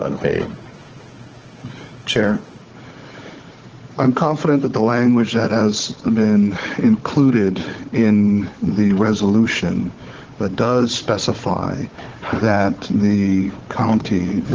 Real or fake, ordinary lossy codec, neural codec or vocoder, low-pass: fake; Opus, 16 kbps; codec, 16 kHz, 4 kbps, FunCodec, trained on Chinese and English, 50 frames a second; 7.2 kHz